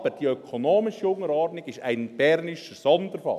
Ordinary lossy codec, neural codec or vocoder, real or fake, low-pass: none; none; real; 14.4 kHz